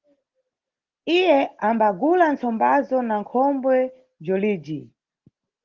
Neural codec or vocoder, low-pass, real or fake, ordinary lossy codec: none; 7.2 kHz; real; Opus, 16 kbps